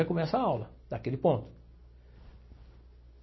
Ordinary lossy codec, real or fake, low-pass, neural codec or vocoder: MP3, 24 kbps; real; 7.2 kHz; none